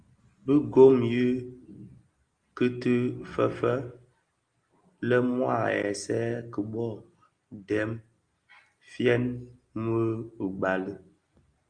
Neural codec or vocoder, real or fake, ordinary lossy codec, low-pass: none; real; Opus, 32 kbps; 9.9 kHz